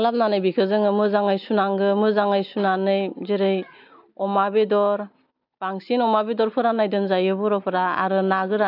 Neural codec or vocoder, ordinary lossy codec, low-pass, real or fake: none; none; 5.4 kHz; real